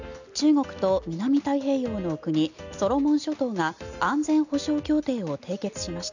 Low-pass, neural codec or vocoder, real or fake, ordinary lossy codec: 7.2 kHz; none; real; none